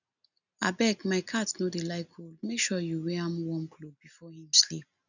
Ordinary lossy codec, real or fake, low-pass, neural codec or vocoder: none; real; 7.2 kHz; none